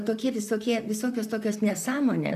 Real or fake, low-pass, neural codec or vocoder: fake; 14.4 kHz; vocoder, 44.1 kHz, 128 mel bands, Pupu-Vocoder